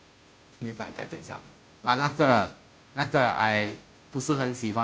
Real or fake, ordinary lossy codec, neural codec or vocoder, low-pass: fake; none; codec, 16 kHz, 0.5 kbps, FunCodec, trained on Chinese and English, 25 frames a second; none